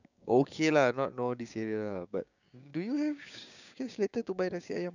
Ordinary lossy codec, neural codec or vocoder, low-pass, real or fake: none; codec, 16 kHz, 6 kbps, DAC; 7.2 kHz; fake